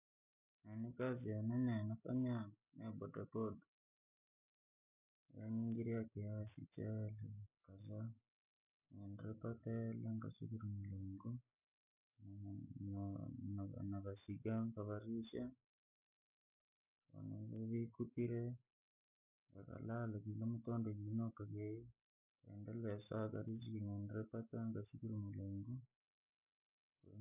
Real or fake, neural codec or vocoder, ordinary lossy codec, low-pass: real; none; none; 3.6 kHz